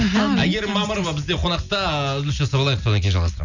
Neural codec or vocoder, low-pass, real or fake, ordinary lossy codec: none; 7.2 kHz; real; none